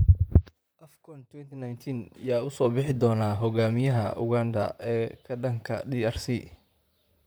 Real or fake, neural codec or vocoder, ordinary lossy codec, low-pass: fake; vocoder, 44.1 kHz, 128 mel bands, Pupu-Vocoder; none; none